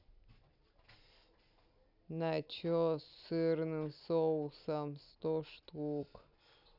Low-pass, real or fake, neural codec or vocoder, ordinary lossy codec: 5.4 kHz; real; none; none